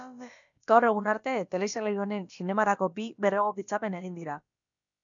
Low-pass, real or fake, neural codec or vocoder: 7.2 kHz; fake; codec, 16 kHz, about 1 kbps, DyCAST, with the encoder's durations